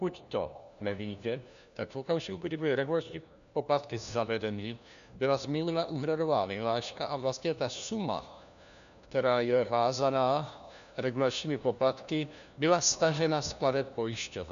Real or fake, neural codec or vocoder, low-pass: fake; codec, 16 kHz, 1 kbps, FunCodec, trained on LibriTTS, 50 frames a second; 7.2 kHz